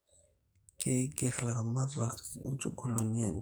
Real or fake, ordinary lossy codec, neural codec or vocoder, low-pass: fake; none; codec, 44.1 kHz, 2.6 kbps, SNAC; none